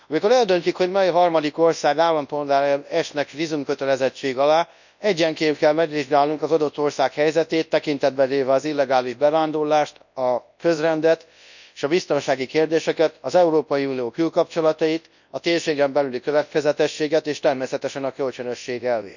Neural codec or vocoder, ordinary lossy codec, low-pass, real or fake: codec, 24 kHz, 0.9 kbps, WavTokenizer, large speech release; none; 7.2 kHz; fake